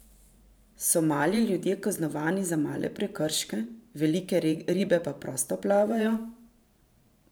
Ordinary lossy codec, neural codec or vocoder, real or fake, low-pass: none; vocoder, 44.1 kHz, 128 mel bands every 512 samples, BigVGAN v2; fake; none